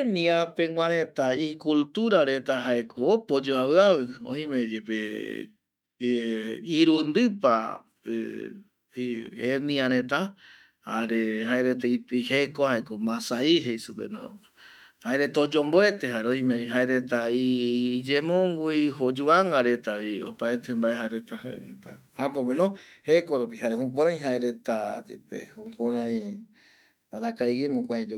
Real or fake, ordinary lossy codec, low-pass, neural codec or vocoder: fake; none; 19.8 kHz; autoencoder, 48 kHz, 32 numbers a frame, DAC-VAE, trained on Japanese speech